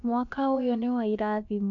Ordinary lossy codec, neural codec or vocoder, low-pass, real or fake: AAC, 64 kbps; codec, 16 kHz, about 1 kbps, DyCAST, with the encoder's durations; 7.2 kHz; fake